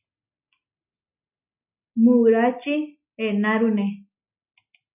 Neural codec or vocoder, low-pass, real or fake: none; 3.6 kHz; real